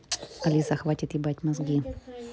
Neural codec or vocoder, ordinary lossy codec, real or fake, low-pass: none; none; real; none